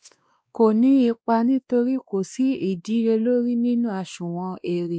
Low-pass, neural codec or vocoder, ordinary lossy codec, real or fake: none; codec, 16 kHz, 2 kbps, X-Codec, WavLM features, trained on Multilingual LibriSpeech; none; fake